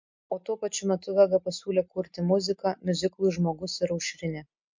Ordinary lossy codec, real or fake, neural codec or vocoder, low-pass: MP3, 48 kbps; real; none; 7.2 kHz